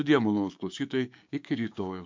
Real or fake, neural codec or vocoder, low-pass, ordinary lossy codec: fake; codec, 16 kHz, 6 kbps, DAC; 7.2 kHz; MP3, 48 kbps